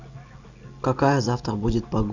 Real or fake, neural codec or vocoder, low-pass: real; none; 7.2 kHz